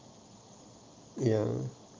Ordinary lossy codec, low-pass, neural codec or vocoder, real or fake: Opus, 24 kbps; 7.2 kHz; none; real